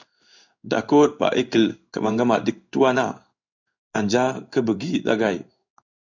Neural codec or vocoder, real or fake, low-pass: codec, 16 kHz in and 24 kHz out, 1 kbps, XY-Tokenizer; fake; 7.2 kHz